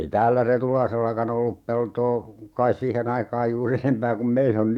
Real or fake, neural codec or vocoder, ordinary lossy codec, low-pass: fake; codec, 44.1 kHz, 7.8 kbps, DAC; none; 19.8 kHz